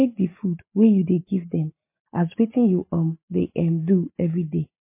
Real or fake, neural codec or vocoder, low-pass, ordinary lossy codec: real; none; 3.6 kHz; MP3, 24 kbps